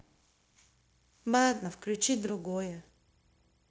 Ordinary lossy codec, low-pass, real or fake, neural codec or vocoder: none; none; fake; codec, 16 kHz, 0.9 kbps, LongCat-Audio-Codec